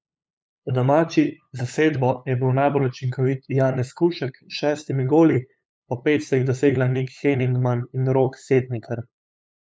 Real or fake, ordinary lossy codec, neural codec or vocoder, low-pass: fake; none; codec, 16 kHz, 8 kbps, FunCodec, trained on LibriTTS, 25 frames a second; none